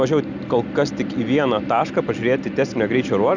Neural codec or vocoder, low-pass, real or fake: none; 7.2 kHz; real